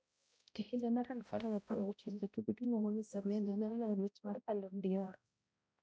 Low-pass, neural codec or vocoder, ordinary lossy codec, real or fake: none; codec, 16 kHz, 0.5 kbps, X-Codec, HuBERT features, trained on balanced general audio; none; fake